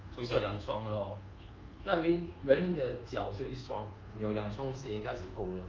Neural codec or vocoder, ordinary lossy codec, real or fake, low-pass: codec, 24 kHz, 1.2 kbps, DualCodec; Opus, 24 kbps; fake; 7.2 kHz